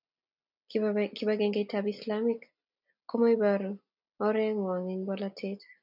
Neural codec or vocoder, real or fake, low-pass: none; real; 5.4 kHz